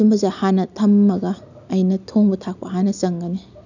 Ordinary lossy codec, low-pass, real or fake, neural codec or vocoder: none; 7.2 kHz; real; none